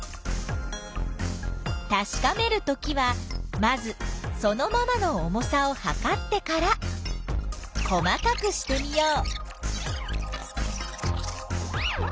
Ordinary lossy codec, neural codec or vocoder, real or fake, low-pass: none; none; real; none